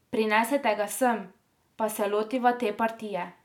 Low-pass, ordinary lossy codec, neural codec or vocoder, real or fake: 19.8 kHz; none; none; real